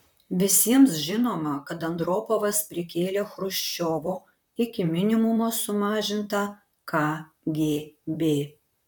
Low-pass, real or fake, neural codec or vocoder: 19.8 kHz; fake; vocoder, 44.1 kHz, 128 mel bands, Pupu-Vocoder